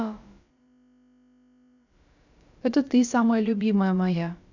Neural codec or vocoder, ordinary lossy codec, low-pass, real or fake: codec, 16 kHz, about 1 kbps, DyCAST, with the encoder's durations; none; 7.2 kHz; fake